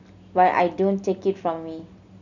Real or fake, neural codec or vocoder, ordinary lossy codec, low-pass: real; none; none; 7.2 kHz